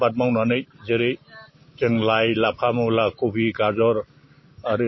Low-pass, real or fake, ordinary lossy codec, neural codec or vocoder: 7.2 kHz; real; MP3, 24 kbps; none